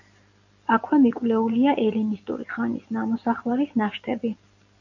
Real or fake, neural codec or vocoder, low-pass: real; none; 7.2 kHz